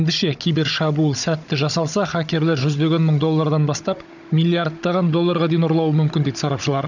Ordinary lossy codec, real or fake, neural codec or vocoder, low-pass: none; fake; codec, 16 kHz, 16 kbps, FunCodec, trained on Chinese and English, 50 frames a second; 7.2 kHz